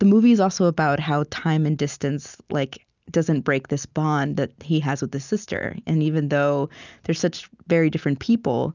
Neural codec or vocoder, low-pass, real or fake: none; 7.2 kHz; real